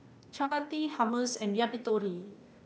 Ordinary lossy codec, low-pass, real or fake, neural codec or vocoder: none; none; fake; codec, 16 kHz, 0.8 kbps, ZipCodec